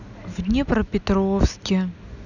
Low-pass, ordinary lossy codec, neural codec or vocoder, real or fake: 7.2 kHz; none; none; real